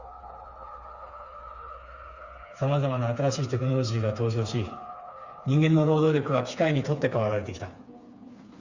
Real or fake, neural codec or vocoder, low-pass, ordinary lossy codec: fake; codec, 16 kHz, 4 kbps, FreqCodec, smaller model; 7.2 kHz; Opus, 64 kbps